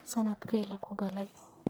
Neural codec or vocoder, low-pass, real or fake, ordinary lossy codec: codec, 44.1 kHz, 1.7 kbps, Pupu-Codec; none; fake; none